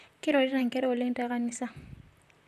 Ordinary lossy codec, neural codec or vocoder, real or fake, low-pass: none; vocoder, 44.1 kHz, 128 mel bands, Pupu-Vocoder; fake; 14.4 kHz